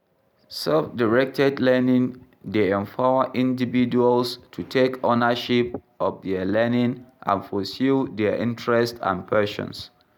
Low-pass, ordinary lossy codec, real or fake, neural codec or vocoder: 19.8 kHz; none; real; none